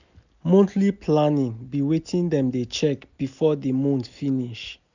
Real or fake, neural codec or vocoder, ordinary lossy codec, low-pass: real; none; none; 7.2 kHz